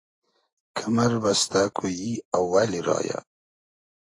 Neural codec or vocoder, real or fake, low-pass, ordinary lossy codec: none; real; 10.8 kHz; AAC, 32 kbps